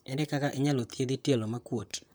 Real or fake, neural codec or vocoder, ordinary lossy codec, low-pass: fake; vocoder, 44.1 kHz, 128 mel bands, Pupu-Vocoder; none; none